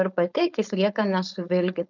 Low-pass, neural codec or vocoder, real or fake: 7.2 kHz; codec, 16 kHz, 4.8 kbps, FACodec; fake